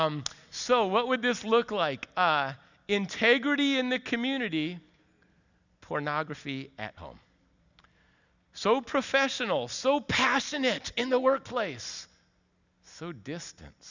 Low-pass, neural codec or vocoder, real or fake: 7.2 kHz; none; real